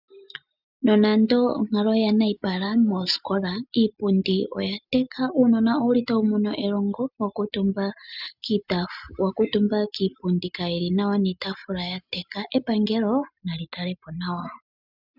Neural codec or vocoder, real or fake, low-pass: none; real; 5.4 kHz